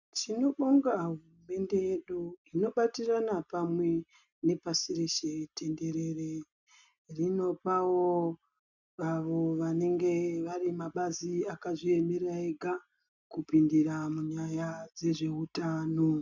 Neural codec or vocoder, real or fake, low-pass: none; real; 7.2 kHz